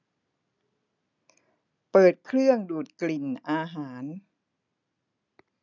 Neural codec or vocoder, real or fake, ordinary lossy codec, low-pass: none; real; none; 7.2 kHz